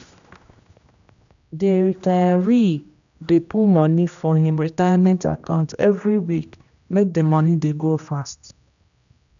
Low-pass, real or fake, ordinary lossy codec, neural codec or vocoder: 7.2 kHz; fake; none; codec, 16 kHz, 1 kbps, X-Codec, HuBERT features, trained on general audio